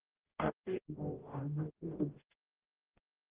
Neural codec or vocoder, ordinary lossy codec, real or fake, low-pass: codec, 44.1 kHz, 0.9 kbps, DAC; Opus, 16 kbps; fake; 3.6 kHz